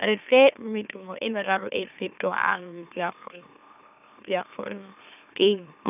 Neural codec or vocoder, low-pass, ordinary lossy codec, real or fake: autoencoder, 44.1 kHz, a latent of 192 numbers a frame, MeloTTS; 3.6 kHz; none; fake